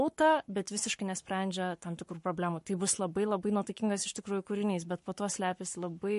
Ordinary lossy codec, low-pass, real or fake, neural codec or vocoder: MP3, 48 kbps; 14.4 kHz; fake; codec, 44.1 kHz, 7.8 kbps, Pupu-Codec